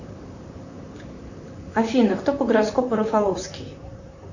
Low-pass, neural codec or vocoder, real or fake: 7.2 kHz; vocoder, 44.1 kHz, 128 mel bands, Pupu-Vocoder; fake